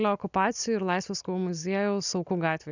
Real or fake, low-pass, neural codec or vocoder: real; 7.2 kHz; none